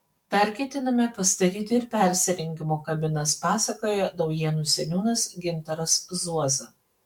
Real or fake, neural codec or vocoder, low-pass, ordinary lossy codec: fake; codec, 44.1 kHz, 7.8 kbps, DAC; 19.8 kHz; MP3, 96 kbps